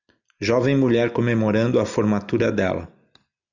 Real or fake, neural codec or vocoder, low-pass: real; none; 7.2 kHz